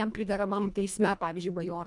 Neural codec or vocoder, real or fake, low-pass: codec, 24 kHz, 1.5 kbps, HILCodec; fake; 10.8 kHz